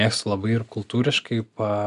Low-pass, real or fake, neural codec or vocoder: 10.8 kHz; real; none